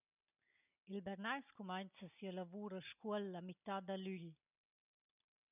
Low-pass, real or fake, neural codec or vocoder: 3.6 kHz; real; none